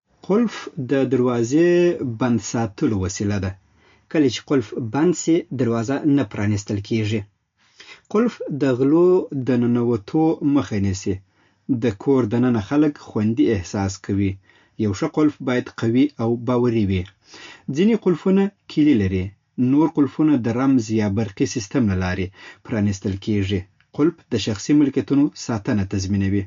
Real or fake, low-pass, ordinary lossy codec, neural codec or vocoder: real; 7.2 kHz; MP3, 48 kbps; none